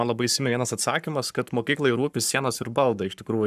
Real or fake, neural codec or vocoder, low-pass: fake; codec, 44.1 kHz, 7.8 kbps, Pupu-Codec; 14.4 kHz